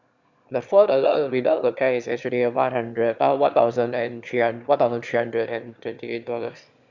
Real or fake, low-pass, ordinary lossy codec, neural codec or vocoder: fake; 7.2 kHz; Opus, 64 kbps; autoencoder, 22.05 kHz, a latent of 192 numbers a frame, VITS, trained on one speaker